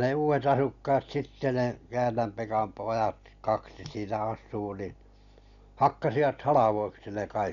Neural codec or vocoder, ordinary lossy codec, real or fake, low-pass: none; none; real; 7.2 kHz